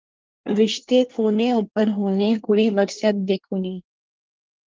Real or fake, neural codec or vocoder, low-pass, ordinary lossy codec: fake; codec, 24 kHz, 1 kbps, SNAC; 7.2 kHz; Opus, 32 kbps